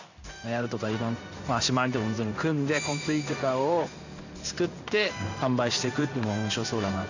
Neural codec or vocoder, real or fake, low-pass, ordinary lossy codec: codec, 16 kHz in and 24 kHz out, 1 kbps, XY-Tokenizer; fake; 7.2 kHz; none